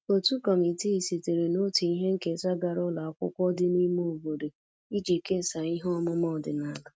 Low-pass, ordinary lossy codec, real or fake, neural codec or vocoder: none; none; real; none